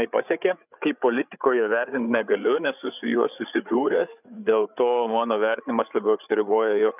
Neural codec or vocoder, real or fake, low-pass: codec, 16 kHz, 8 kbps, FreqCodec, larger model; fake; 3.6 kHz